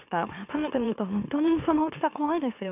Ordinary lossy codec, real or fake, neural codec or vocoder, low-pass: none; fake; autoencoder, 44.1 kHz, a latent of 192 numbers a frame, MeloTTS; 3.6 kHz